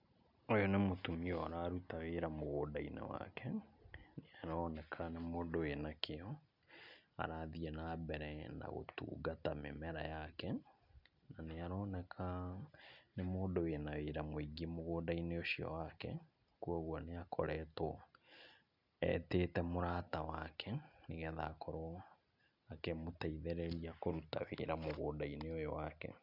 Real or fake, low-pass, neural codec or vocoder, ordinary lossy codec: real; 5.4 kHz; none; none